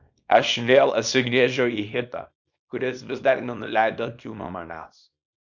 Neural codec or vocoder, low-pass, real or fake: codec, 24 kHz, 0.9 kbps, WavTokenizer, small release; 7.2 kHz; fake